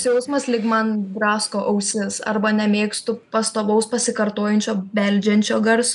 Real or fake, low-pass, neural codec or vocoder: real; 10.8 kHz; none